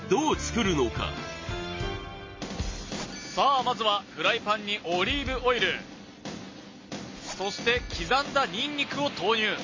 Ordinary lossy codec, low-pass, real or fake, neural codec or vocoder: MP3, 32 kbps; 7.2 kHz; real; none